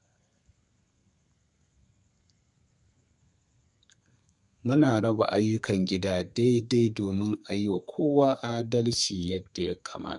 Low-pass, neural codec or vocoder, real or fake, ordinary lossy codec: 10.8 kHz; codec, 32 kHz, 1.9 kbps, SNAC; fake; none